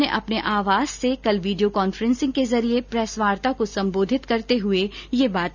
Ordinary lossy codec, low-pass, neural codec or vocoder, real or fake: none; 7.2 kHz; none; real